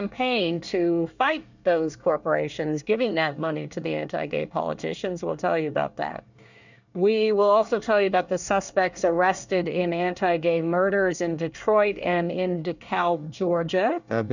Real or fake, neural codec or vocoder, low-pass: fake; codec, 24 kHz, 1 kbps, SNAC; 7.2 kHz